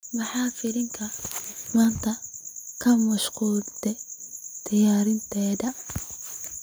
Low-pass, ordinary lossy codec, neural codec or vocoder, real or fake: none; none; none; real